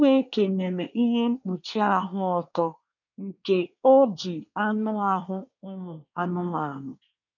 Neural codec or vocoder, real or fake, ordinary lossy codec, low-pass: codec, 24 kHz, 1 kbps, SNAC; fake; none; 7.2 kHz